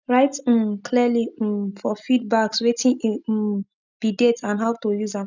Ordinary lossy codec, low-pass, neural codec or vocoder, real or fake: none; 7.2 kHz; none; real